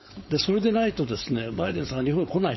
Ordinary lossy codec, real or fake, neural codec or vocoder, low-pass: MP3, 24 kbps; fake; codec, 16 kHz, 4.8 kbps, FACodec; 7.2 kHz